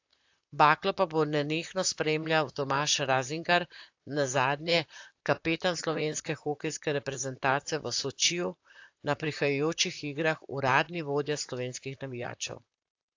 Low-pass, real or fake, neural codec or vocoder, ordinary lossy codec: 7.2 kHz; fake; vocoder, 44.1 kHz, 128 mel bands, Pupu-Vocoder; AAC, 48 kbps